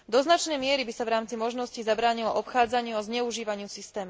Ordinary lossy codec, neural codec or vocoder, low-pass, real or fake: none; none; none; real